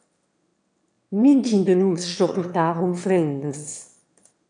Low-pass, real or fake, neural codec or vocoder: 9.9 kHz; fake; autoencoder, 22.05 kHz, a latent of 192 numbers a frame, VITS, trained on one speaker